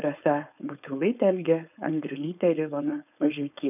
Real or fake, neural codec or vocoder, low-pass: fake; codec, 16 kHz, 4.8 kbps, FACodec; 3.6 kHz